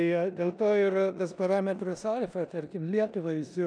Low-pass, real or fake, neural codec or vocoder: 9.9 kHz; fake; codec, 16 kHz in and 24 kHz out, 0.9 kbps, LongCat-Audio-Codec, four codebook decoder